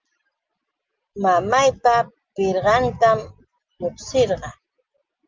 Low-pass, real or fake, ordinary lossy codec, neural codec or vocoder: 7.2 kHz; real; Opus, 24 kbps; none